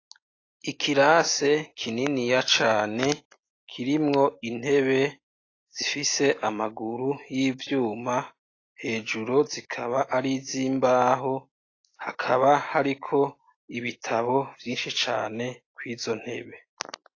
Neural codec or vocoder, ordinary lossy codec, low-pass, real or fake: none; AAC, 32 kbps; 7.2 kHz; real